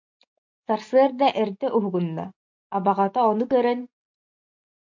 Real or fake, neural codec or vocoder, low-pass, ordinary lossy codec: real; none; 7.2 kHz; MP3, 48 kbps